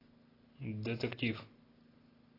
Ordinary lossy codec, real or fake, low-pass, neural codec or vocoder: AAC, 24 kbps; real; 5.4 kHz; none